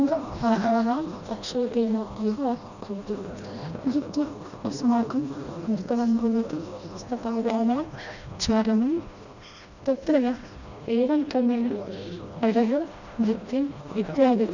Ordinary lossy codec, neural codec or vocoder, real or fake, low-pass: none; codec, 16 kHz, 1 kbps, FreqCodec, smaller model; fake; 7.2 kHz